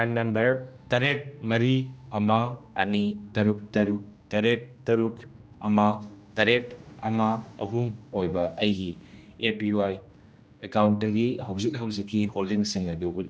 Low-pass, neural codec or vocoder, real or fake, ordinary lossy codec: none; codec, 16 kHz, 1 kbps, X-Codec, HuBERT features, trained on general audio; fake; none